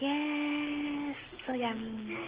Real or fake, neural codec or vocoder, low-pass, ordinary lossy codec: real; none; 3.6 kHz; Opus, 16 kbps